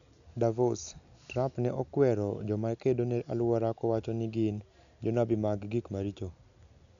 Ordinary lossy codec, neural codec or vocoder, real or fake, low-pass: none; none; real; 7.2 kHz